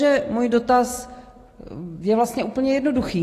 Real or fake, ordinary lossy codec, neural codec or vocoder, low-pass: real; AAC, 48 kbps; none; 14.4 kHz